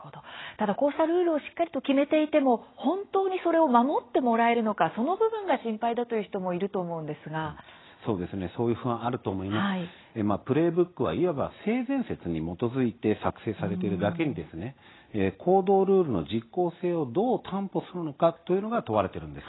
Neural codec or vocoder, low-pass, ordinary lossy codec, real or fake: none; 7.2 kHz; AAC, 16 kbps; real